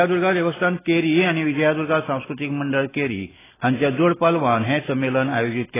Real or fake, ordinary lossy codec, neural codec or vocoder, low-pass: real; AAC, 16 kbps; none; 3.6 kHz